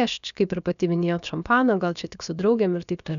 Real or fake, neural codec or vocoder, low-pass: fake; codec, 16 kHz, about 1 kbps, DyCAST, with the encoder's durations; 7.2 kHz